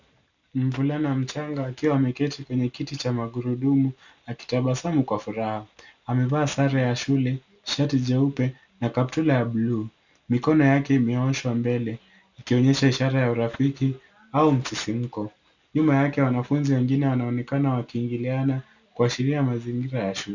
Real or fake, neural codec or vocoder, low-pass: real; none; 7.2 kHz